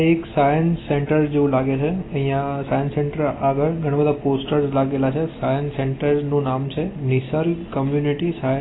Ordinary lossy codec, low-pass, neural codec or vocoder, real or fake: AAC, 16 kbps; 7.2 kHz; none; real